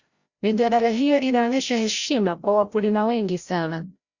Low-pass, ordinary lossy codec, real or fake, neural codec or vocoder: 7.2 kHz; Opus, 64 kbps; fake; codec, 16 kHz, 0.5 kbps, FreqCodec, larger model